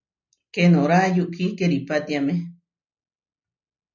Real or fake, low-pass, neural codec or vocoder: real; 7.2 kHz; none